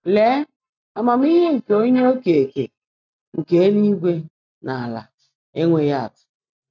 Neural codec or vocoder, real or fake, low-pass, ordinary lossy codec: none; real; 7.2 kHz; none